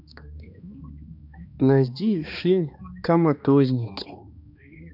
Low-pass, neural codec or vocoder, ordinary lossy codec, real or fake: 5.4 kHz; codec, 16 kHz, 2 kbps, X-Codec, HuBERT features, trained on balanced general audio; none; fake